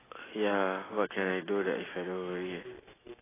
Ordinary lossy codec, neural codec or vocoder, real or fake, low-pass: AAC, 16 kbps; none; real; 3.6 kHz